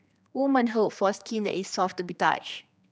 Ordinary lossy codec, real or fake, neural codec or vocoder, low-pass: none; fake; codec, 16 kHz, 2 kbps, X-Codec, HuBERT features, trained on general audio; none